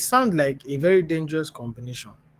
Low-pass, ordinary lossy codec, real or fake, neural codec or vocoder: 14.4 kHz; Opus, 24 kbps; fake; codec, 44.1 kHz, 7.8 kbps, DAC